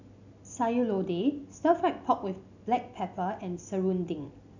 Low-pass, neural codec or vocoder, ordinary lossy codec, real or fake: 7.2 kHz; none; none; real